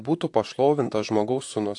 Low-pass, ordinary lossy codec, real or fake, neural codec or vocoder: 10.8 kHz; AAC, 64 kbps; fake; vocoder, 44.1 kHz, 128 mel bands, Pupu-Vocoder